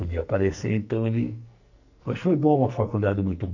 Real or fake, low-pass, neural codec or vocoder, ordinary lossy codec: fake; 7.2 kHz; codec, 44.1 kHz, 2.6 kbps, SNAC; none